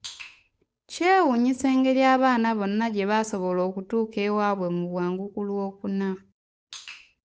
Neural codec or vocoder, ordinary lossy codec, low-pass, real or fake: codec, 16 kHz, 8 kbps, FunCodec, trained on Chinese and English, 25 frames a second; none; none; fake